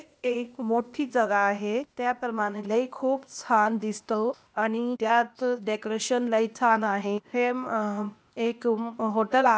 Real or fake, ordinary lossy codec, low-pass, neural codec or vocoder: fake; none; none; codec, 16 kHz, 0.8 kbps, ZipCodec